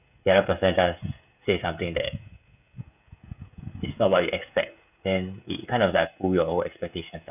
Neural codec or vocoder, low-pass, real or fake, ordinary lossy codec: codec, 16 kHz, 16 kbps, FreqCodec, smaller model; 3.6 kHz; fake; none